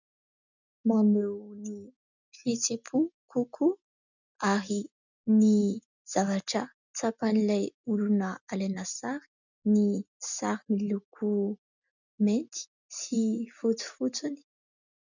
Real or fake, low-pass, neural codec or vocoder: real; 7.2 kHz; none